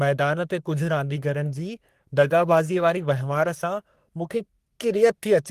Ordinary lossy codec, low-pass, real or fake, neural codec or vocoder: Opus, 24 kbps; 14.4 kHz; fake; codec, 44.1 kHz, 3.4 kbps, Pupu-Codec